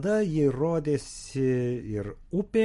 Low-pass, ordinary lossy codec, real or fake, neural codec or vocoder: 14.4 kHz; MP3, 48 kbps; real; none